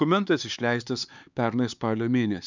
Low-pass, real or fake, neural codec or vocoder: 7.2 kHz; fake; codec, 16 kHz, 4 kbps, X-Codec, HuBERT features, trained on LibriSpeech